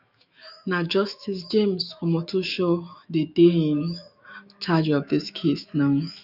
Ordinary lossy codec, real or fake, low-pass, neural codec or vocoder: none; fake; 5.4 kHz; autoencoder, 48 kHz, 128 numbers a frame, DAC-VAE, trained on Japanese speech